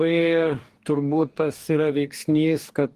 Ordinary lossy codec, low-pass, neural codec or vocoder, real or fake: Opus, 24 kbps; 14.4 kHz; codec, 44.1 kHz, 2.6 kbps, DAC; fake